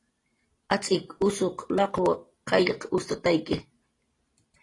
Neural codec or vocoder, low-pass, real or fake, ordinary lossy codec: none; 10.8 kHz; real; AAC, 32 kbps